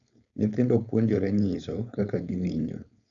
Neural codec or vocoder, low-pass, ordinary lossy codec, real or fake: codec, 16 kHz, 4.8 kbps, FACodec; 7.2 kHz; none; fake